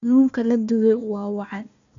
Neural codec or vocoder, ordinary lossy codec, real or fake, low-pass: codec, 16 kHz, 1 kbps, FunCodec, trained on Chinese and English, 50 frames a second; none; fake; 7.2 kHz